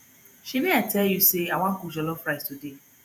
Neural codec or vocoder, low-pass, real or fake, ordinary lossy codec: vocoder, 48 kHz, 128 mel bands, Vocos; none; fake; none